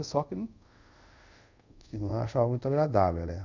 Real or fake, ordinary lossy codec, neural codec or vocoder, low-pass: fake; none; codec, 24 kHz, 0.5 kbps, DualCodec; 7.2 kHz